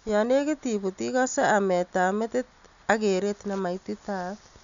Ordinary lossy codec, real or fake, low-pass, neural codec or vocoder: none; real; 7.2 kHz; none